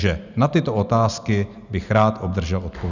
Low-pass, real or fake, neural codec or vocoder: 7.2 kHz; real; none